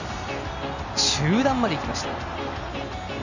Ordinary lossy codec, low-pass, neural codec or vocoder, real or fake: none; 7.2 kHz; none; real